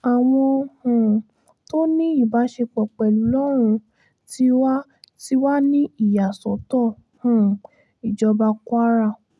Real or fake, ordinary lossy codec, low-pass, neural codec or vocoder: real; none; none; none